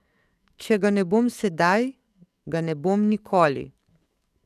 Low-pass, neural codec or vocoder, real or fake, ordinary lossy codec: 14.4 kHz; codec, 44.1 kHz, 7.8 kbps, DAC; fake; none